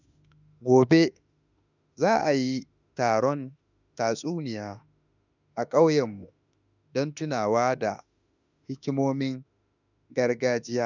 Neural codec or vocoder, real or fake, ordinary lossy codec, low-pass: autoencoder, 48 kHz, 32 numbers a frame, DAC-VAE, trained on Japanese speech; fake; none; 7.2 kHz